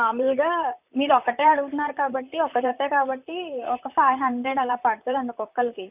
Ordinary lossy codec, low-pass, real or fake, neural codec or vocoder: none; 3.6 kHz; fake; vocoder, 44.1 kHz, 128 mel bands, Pupu-Vocoder